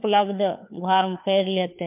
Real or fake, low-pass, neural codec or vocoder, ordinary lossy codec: fake; 3.6 kHz; codec, 16 kHz, 4 kbps, FunCodec, trained on LibriTTS, 50 frames a second; MP3, 32 kbps